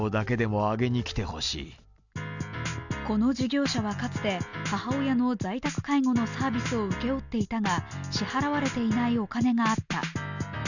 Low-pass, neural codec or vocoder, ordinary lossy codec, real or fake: 7.2 kHz; none; none; real